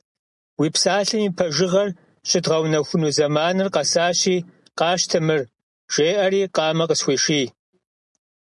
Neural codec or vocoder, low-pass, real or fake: none; 10.8 kHz; real